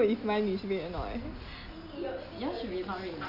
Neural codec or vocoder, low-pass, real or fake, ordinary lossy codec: none; 5.4 kHz; real; AAC, 48 kbps